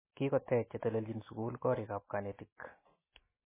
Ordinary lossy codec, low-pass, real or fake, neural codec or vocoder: MP3, 16 kbps; 3.6 kHz; real; none